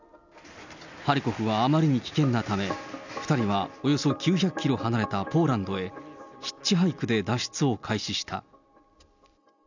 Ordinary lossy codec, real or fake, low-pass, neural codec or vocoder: none; real; 7.2 kHz; none